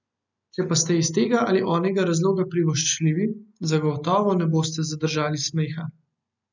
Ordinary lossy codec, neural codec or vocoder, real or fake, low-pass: none; none; real; 7.2 kHz